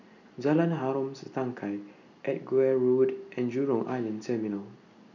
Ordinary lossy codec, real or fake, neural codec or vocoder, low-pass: none; real; none; 7.2 kHz